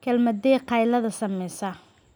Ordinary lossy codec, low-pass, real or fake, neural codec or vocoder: none; none; real; none